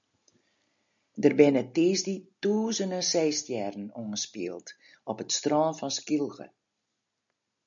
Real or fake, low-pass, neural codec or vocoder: real; 7.2 kHz; none